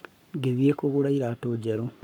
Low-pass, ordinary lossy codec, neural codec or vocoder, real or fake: 19.8 kHz; none; codec, 44.1 kHz, 7.8 kbps, Pupu-Codec; fake